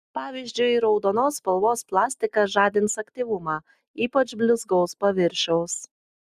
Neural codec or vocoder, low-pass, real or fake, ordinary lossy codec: none; 14.4 kHz; real; Opus, 64 kbps